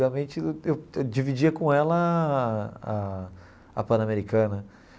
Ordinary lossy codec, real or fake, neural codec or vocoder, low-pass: none; real; none; none